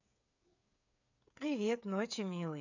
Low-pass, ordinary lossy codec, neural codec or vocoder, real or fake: 7.2 kHz; none; codec, 16 kHz, 8 kbps, FreqCodec, smaller model; fake